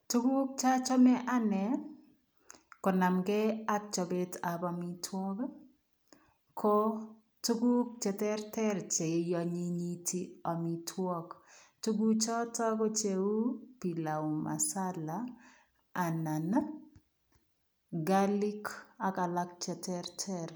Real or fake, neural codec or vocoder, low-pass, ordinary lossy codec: real; none; none; none